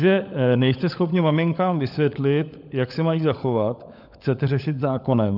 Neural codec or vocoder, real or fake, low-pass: codec, 16 kHz, 16 kbps, FunCodec, trained on LibriTTS, 50 frames a second; fake; 5.4 kHz